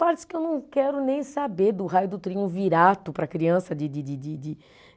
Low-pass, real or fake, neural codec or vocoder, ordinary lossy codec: none; real; none; none